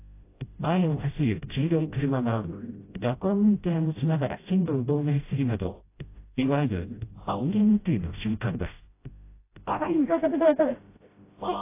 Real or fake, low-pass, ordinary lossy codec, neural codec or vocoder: fake; 3.6 kHz; AAC, 24 kbps; codec, 16 kHz, 0.5 kbps, FreqCodec, smaller model